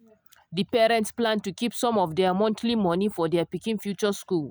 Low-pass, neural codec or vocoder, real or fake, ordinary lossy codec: none; none; real; none